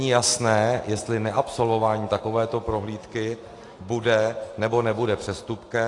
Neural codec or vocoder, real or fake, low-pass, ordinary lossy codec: none; real; 10.8 kHz; AAC, 48 kbps